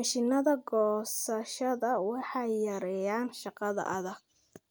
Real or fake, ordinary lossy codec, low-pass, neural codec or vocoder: real; none; none; none